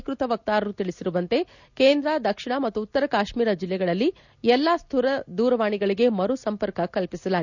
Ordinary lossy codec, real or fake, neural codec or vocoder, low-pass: none; real; none; 7.2 kHz